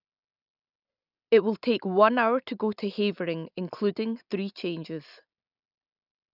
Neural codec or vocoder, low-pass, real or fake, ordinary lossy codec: none; 5.4 kHz; real; none